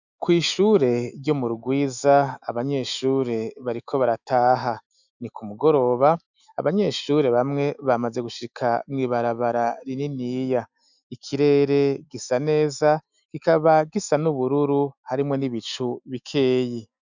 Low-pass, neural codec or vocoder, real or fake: 7.2 kHz; autoencoder, 48 kHz, 128 numbers a frame, DAC-VAE, trained on Japanese speech; fake